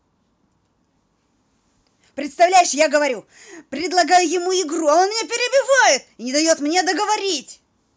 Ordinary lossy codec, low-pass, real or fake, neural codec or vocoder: none; none; real; none